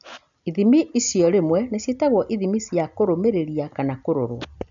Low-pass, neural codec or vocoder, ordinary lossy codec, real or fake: 7.2 kHz; none; none; real